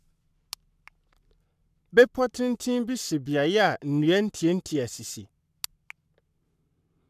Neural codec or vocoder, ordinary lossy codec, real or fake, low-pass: codec, 44.1 kHz, 7.8 kbps, Pupu-Codec; none; fake; 14.4 kHz